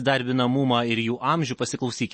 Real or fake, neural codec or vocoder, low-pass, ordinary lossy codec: real; none; 9.9 kHz; MP3, 32 kbps